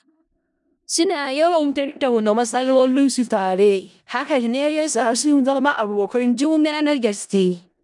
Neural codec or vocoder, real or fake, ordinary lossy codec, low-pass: codec, 16 kHz in and 24 kHz out, 0.4 kbps, LongCat-Audio-Codec, four codebook decoder; fake; none; 10.8 kHz